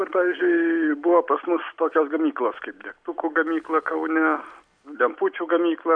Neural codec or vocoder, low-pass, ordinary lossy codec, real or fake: none; 9.9 kHz; MP3, 96 kbps; real